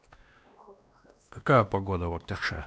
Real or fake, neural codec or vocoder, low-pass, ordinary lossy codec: fake; codec, 16 kHz, 1 kbps, X-Codec, WavLM features, trained on Multilingual LibriSpeech; none; none